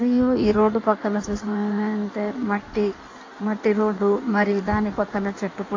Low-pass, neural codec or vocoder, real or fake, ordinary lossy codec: 7.2 kHz; codec, 16 kHz in and 24 kHz out, 1.1 kbps, FireRedTTS-2 codec; fake; AAC, 32 kbps